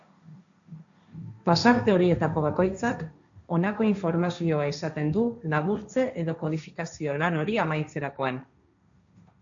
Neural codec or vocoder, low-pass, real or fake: codec, 16 kHz, 1.1 kbps, Voila-Tokenizer; 7.2 kHz; fake